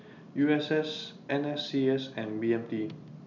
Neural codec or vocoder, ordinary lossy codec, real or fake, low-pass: none; none; real; 7.2 kHz